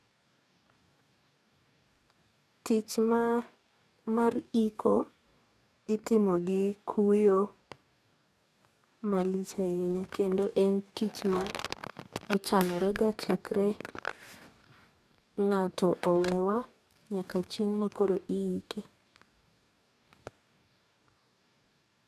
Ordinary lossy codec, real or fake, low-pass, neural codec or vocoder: none; fake; 14.4 kHz; codec, 44.1 kHz, 2.6 kbps, DAC